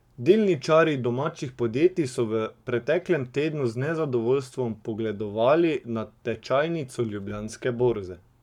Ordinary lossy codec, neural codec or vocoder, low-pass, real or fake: none; codec, 44.1 kHz, 7.8 kbps, Pupu-Codec; 19.8 kHz; fake